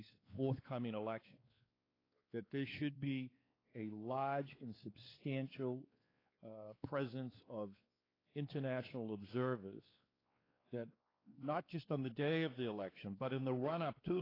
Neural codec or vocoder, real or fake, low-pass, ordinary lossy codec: codec, 16 kHz, 4 kbps, X-Codec, WavLM features, trained on Multilingual LibriSpeech; fake; 5.4 kHz; AAC, 24 kbps